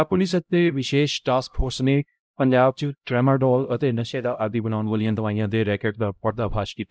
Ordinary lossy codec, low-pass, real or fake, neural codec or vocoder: none; none; fake; codec, 16 kHz, 0.5 kbps, X-Codec, HuBERT features, trained on LibriSpeech